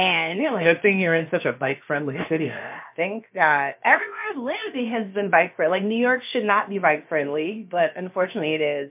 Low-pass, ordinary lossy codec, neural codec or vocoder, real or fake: 3.6 kHz; MP3, 32 kbps; codec, 16 kHz, about 1 kbps, DyCAST, with the encoder's durations; fake